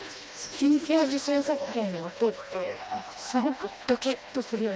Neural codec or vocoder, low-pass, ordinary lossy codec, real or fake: codec, 16 kHz, 1 kbps, FreqCodec, smaller model; none; none; fake